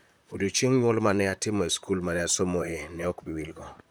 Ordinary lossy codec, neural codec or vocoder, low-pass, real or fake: none; vocoder, 44.1 kHz, 128 mel bands, Pupu-Vocoder; none; fake